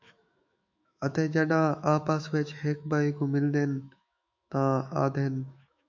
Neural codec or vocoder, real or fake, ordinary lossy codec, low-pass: autoencoder, 48 kHz, 128 numbers a frame, DAC-VAE, trained on Japanese speech; fake; MP3, 64 kbps; 7.2 kHz